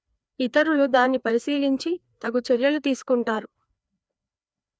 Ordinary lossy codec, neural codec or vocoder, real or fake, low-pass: none; codec, 16 kHz, 2 kbps, FreqCodec, larger model; fake; none